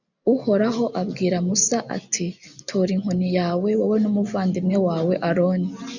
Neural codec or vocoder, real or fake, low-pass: none; real; 7.2 kHz